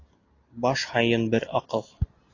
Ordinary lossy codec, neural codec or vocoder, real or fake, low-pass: AAC, 32 kbps; none; real; 7.2 kHz